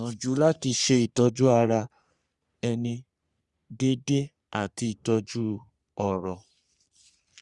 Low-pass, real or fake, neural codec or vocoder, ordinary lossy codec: 10.8 kHz; fake; codec, 44.1 kHz, 3.4 kbps, Pupu-Codec; Opus, 64 kbps